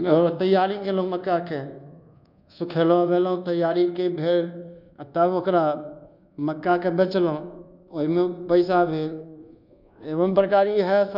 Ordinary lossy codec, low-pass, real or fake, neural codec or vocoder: none; 5.4 kHz; fake; codec, 24 kHz, 1.2 kbps, DualCodec